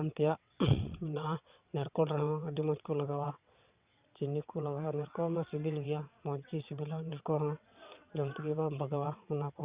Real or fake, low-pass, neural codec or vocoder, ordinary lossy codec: fake; 3.6 kHz; vocoder, 22.05 kHz, 80 mel bands, WaveNeXt; Opus, 64 kbps